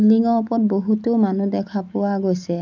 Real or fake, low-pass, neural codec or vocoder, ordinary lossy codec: real; 7.2 kHz; none; none